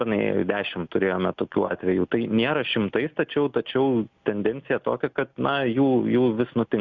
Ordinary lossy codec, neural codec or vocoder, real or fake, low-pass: Opus, 64 kbps; none; real; 7.2 kHz